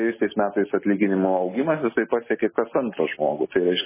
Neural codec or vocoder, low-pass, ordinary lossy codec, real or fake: none; 3.6 kHz; MP3, 16 kbps; real